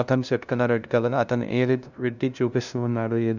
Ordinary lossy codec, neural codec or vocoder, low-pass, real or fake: none; codec, 16 kHz, 0.5 kbps, FunCodec, trained on LibriTTS, 25 frames a second; 7.2 kHz; fake